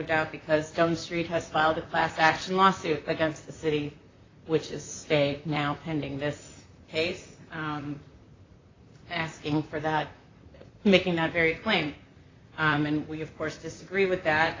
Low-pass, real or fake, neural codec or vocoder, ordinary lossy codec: 7.2 kHz; fake; vocoder, 44.1 kHz, 128 mel bands, Pupu-Vocoder; AAC, 32 kbps